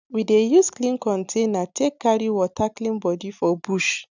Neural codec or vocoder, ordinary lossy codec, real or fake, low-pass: none; none; real; 7.2 kHz